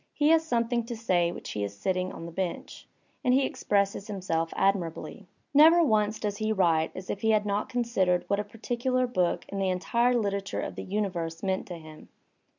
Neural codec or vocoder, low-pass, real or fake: none; 7.2 kHz; real